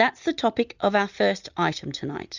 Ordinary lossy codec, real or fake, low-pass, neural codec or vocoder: Opus, 64 kbps; real; 7.2 kHz; none